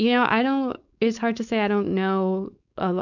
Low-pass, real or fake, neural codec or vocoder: 7.2 kHz; fake; codec, 16 kHz, 4.8 kbps, FACodec